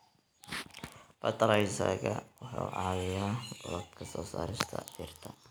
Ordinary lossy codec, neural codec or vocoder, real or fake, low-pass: none; none; real; none